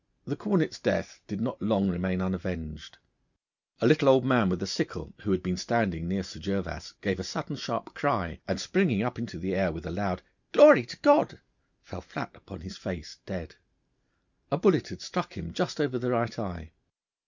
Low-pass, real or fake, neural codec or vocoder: 7.2 kHz; real; none